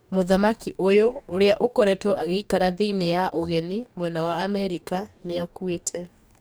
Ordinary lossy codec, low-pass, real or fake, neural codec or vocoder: none; none; fake; codec, 44.1 kHz, 2.6 kbps, DAC